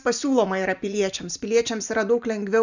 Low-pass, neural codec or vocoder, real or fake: 7.2 kHz; none; real